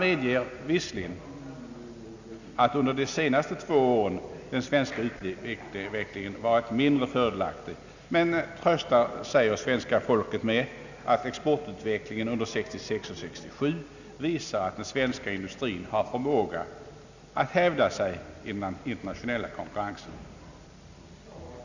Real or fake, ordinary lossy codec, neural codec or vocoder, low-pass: real; none; none; 7.2 kHz